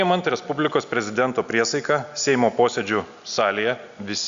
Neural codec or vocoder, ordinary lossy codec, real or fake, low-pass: none; Opus, 64 kbps; real; 7.2 kHz